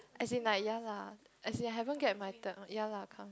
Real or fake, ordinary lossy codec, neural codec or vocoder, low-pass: real; none; none; none